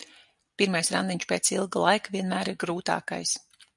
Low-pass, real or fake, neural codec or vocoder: 10.8 kHz; real; none